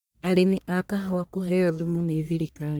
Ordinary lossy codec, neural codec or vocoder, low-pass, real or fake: none; codec, 44.1 kHz, 1.7 kbps, Pupu-Codec; none; fake